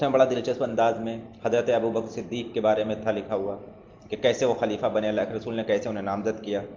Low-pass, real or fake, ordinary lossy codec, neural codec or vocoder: 7.2 kHz; real; Opus, 32 kbps; none